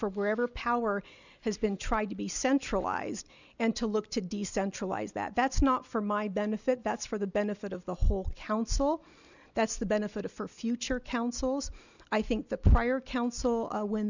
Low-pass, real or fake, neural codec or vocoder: 7.2 kHz; real; none